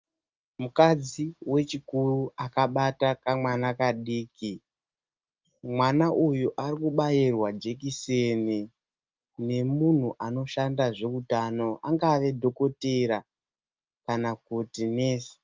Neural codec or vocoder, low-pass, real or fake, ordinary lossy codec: none; 7.2 kHz; real; Opus, 24 kbps